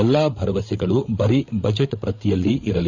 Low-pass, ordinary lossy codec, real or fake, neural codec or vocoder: 7.2 kHz; Opus, 64 kbps; fake; codec, 16 kHz, 8 kbps, FreqCodec, larger model